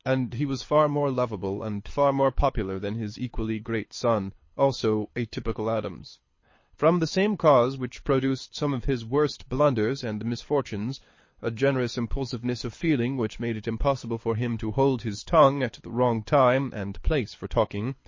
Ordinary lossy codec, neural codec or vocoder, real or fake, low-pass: MP3, 32 kbps; codec, 24 kHz, 6 kbps, HILCodec; fake; 7.2 kHz